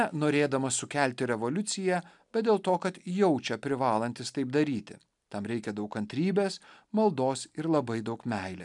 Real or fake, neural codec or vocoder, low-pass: real; none; 10.8 kHz